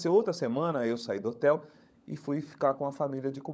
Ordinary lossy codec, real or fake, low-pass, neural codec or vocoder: none; fake; none; codec, 16 kHz, 16 kbps, FunCodec, trained on LibriTTS, 50 frames a second